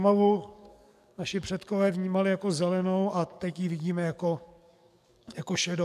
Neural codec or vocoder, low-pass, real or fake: codec, 44.1 kHz, 7.8 kbps, DAC; 14.4 kHz; fake